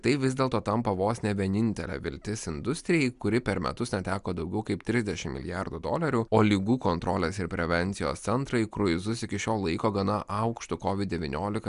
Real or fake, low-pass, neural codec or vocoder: real; 10.8 kHz; none